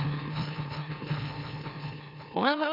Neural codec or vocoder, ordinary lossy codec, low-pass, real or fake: autoencoder, 44.1 kHz, a latent of 192 numbers a frame, MeloTTS; none; 5.4 kHz; fake